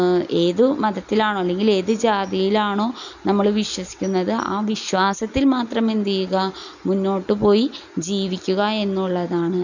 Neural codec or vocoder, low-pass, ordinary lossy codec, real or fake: none; 7.2 kHz; none; real